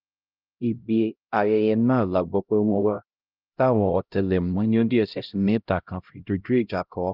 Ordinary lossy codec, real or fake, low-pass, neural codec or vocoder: Opus, 24 kbps; fake; 5.4 kHz; codec, 16 kHz, 0.5 kbps, X-Codec, HuBERT features, trained on LibriSpeech